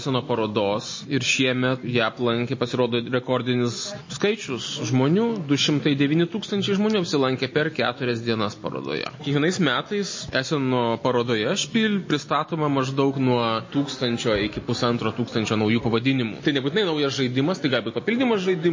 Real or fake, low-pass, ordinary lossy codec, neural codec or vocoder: real; 7.2 kHz; MP3, 32 kbps; none